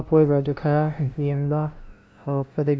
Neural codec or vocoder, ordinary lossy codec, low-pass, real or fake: codec, 16 kHz, 0.5 kbps, FunCodec, trained on LibriTTS, 25 frames a second; none; none; fake